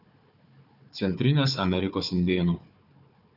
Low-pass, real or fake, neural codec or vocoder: 5.4 kHz; fake; codec, 16 kHz, 4 kbps, FunCodec, trained on Chinese and English, 50 frames a second